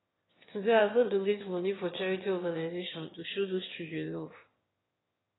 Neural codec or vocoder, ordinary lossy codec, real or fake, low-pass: autoencoder, 22.05 kHz, a latent of 192 numbers a frame, VITS, trained on one speaker; AAC, 16 kbps; fake; 7.2 kHz